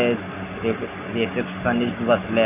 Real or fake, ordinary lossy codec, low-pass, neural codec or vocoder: real; none; 3.6 kHz; none